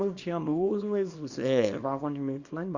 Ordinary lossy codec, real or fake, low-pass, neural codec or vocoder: Opus, 64 kbps; fake; 7.2 kHz; codec, 24 kHz, 0.9 kbps, WavTokenizer, small release